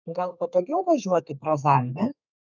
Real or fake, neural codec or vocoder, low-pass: fake; codec, 32 kHz, 1.9 kbps, SNAC; 7.2 kHz